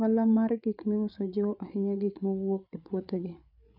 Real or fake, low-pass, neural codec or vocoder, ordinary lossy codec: fake; 5.4 kHz; codec, 16 kHz, 8 kbps, FreqCodec, larger model; none